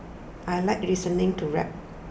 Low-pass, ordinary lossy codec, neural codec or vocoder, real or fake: none; none; none; real